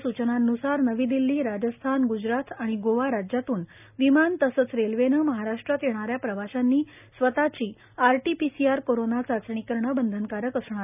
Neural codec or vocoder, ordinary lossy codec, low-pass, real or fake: none; none; 3.6 kHz; real